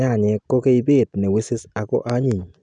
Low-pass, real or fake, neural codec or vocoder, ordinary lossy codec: 9.9 kHz; real; none; none